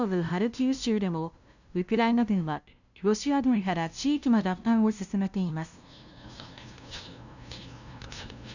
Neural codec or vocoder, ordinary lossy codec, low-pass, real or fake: codec, 16 kHz, 0.5 kbps, FunCodec, trained on LibriTTS, 25 frames a second; none; 7.2 kHz; fake